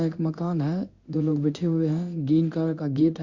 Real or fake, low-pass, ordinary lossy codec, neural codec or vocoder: fake; 7.2 kHz; Opus, 64 kbps; codec, 16 kHz in and 24 kHz out, 1 kbps, XY-Tokenizer